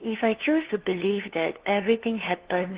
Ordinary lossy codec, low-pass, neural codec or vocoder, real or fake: Opus, 16 kbps; 3.6 kHz; codec, 16 kHz, 2 kbps, FunCodec, trained on LibriTTS, 25 frames a second; fake